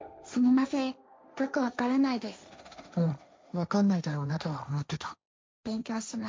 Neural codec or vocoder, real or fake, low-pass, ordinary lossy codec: codec, 16 kHz, 1.1 kbps, Voila-Tokenizer; fake; none; none